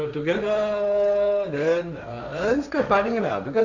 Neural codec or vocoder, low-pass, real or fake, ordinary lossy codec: codec, 16 kHz, 1.1 kbps, Voila-Tokenizer; 7.2 kHz; fake; none